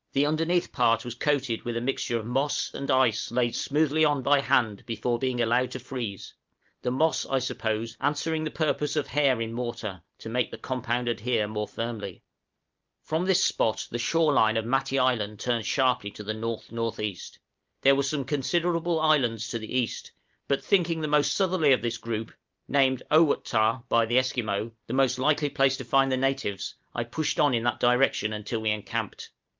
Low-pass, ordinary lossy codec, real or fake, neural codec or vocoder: 7.2 kHz; Opus, 32 kbps; real; none